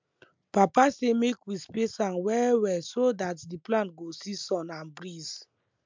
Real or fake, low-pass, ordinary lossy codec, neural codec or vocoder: real; 7.2 kHz; MP3, 64 kbps; none